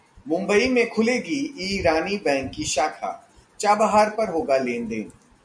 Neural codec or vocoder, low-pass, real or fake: none; 9.9 kHz; real